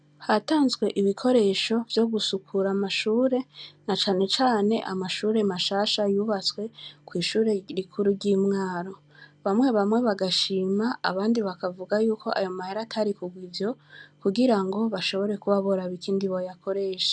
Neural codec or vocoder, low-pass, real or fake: none; 9.9 kHz; real